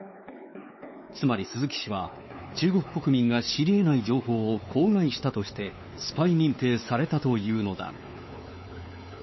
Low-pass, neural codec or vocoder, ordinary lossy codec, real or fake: 7.2 kHz; codec, 16 kHz, 4 kbps, X-Codec, WavLM features, trained on Multilingual LibriSpeech; MP3, 24 kbps; fake